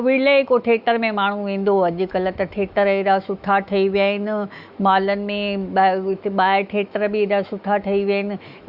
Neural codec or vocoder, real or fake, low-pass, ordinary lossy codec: autoencoder, 48 kHz, 128 numbers a frame, DAC-VAE, trained on Japanese speech; fake; 5.4 kHz; Opus, 64 kbps